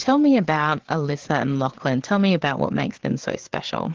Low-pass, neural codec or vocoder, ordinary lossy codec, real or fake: 7.2 kHz; codec, 16 kHz, 4 kbps, FreqCodec, larger model; Opus, 16 kbps; fake